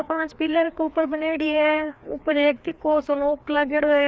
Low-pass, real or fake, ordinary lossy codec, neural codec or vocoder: none; fake; none; codec, 16 kHz, 2 kbps, FreqCodec, larger model